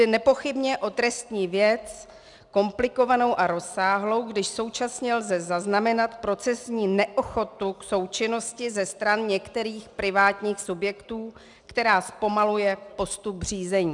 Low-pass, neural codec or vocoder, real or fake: 10.8 kHz; none; real